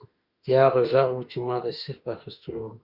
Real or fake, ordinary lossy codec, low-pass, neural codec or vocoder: fake; Opus, 64 kbps; 5.4 kHz; autoencoder, 48 kHz, 32 numbers a frame, DAC-VAE, trained on Japanese speech